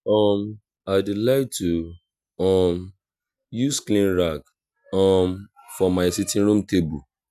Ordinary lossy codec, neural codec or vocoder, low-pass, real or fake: none; none; 14.4 kHz; real